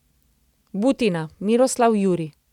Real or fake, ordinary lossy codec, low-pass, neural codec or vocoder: real; none; 19.8 kHz; none